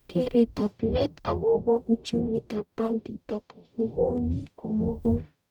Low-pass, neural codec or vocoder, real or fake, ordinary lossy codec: 19.8 kHz; codec, 44.1 kHz, 0.9 kbps, DAC; fake; none